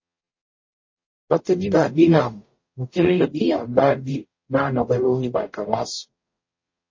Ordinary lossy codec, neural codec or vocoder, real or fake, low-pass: MP3, 32 kbps; codec, 44.1 kHz, 0.9 kbps, DAC; fake; 7.2 kHz